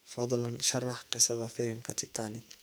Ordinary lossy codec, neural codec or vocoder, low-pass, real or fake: none; codec, 44.1 kHz, 2.6 kbps, SNAC; none; fake